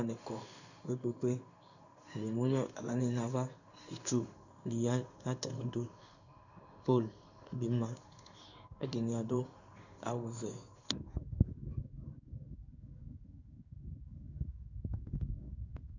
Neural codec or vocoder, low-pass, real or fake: codec, 16 kHz in and 24 kHz out, 1 kbps, XY-Tokenizer; 7.2 kHz; fake